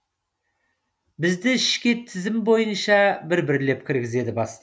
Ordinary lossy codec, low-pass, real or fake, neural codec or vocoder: none; none; real; none